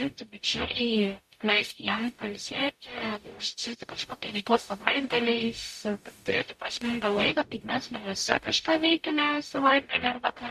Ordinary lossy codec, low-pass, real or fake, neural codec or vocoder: MP3, 64 kbps; 14.4 kHz; fake; codec, 44.1 kHz, 0.9 kbps, DAC